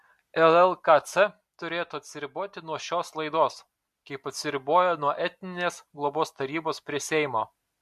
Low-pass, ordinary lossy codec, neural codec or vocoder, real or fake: 14.4 kHz; MP3, 64 kbps; none; real